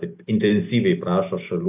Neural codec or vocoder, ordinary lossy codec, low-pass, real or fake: none; AAC, 32 kbps; 3.6 kHz; real